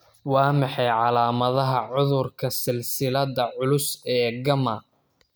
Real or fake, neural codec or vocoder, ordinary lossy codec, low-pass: real; none; none; none